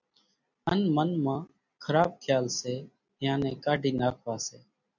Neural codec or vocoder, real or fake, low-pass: none; real; 7.2 kHz